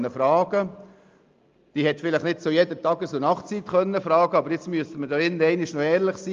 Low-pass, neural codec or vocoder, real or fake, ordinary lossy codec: 7.2 kHz; none; real; Opus, 32 kbps